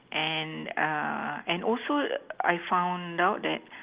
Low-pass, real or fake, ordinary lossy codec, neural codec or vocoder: 3.6 kHz; real; Opus, 32 kbps; none